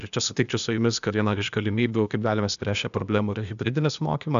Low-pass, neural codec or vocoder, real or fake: 7.2 kHz; codec, 16 kHz, 0.8 kbps, ZipCodec; fake